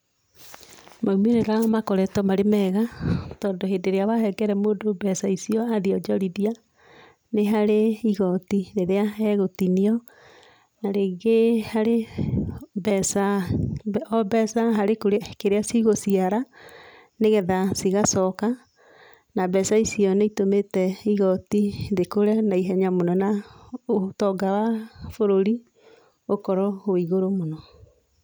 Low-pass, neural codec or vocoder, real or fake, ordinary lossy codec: none; none; real; none